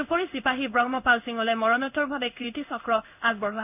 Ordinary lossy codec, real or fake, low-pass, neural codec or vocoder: none; fake; 3.6 kHz; codec, 16 kHz in and 24 kHz out, 1 kbps, XY-Tokenizer